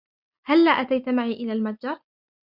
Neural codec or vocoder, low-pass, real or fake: none; 5.4 kHz; real